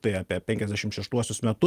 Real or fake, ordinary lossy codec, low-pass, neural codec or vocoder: real; Opus, 32 kbps; 14.4 kHz; none